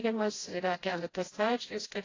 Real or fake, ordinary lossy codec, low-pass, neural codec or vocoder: fake; AAC, 32 kbps; 7.2 kHz; codec, 16 kHz, 0.5 kbps, FreqCodec, smaller model